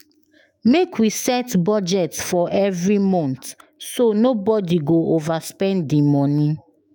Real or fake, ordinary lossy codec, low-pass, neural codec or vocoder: fake; none; none; autoencoder, 48 kHz, 128 numbers a frame, DAC-VAE, trained on Japanese speech